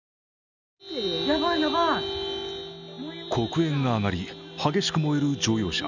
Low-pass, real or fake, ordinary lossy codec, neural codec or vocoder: 7.2 kHz; real; none; none